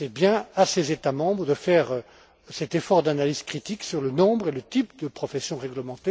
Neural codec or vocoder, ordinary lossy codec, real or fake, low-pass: none; none; real; none